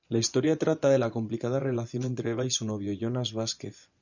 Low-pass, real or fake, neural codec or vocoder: 7.2 kHz; fake; vocoder, 24 kHz, 100 mel bands, Vocos